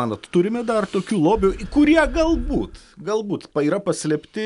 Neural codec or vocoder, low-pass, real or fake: none; 10.8 kHz; real